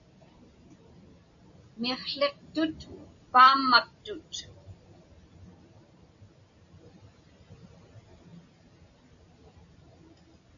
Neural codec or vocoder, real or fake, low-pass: none; real; 7.2 kHz